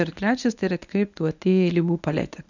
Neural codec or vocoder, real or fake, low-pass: codec, 24 kHz, 0.9 kbps, WavTokenizer, medium speech release version 1; fake; 7.2 kHz